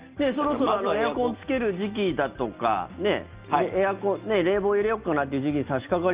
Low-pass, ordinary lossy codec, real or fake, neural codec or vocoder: 3.6 kHz; Opus, 24 kbps; real; none